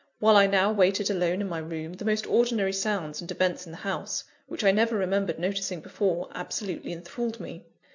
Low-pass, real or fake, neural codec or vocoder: 7.2 kHz; real; none